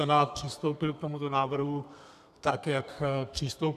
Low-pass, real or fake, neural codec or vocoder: 14.4 kHz; fake; codec, 32 kHz, 1.9 kbps, SNAC